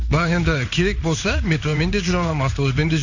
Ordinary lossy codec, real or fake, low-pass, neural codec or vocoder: none; fake; 7.2 kHz; codec, 16 kHz in and 24 kHz out, 1 kbps, XY-Tokenizer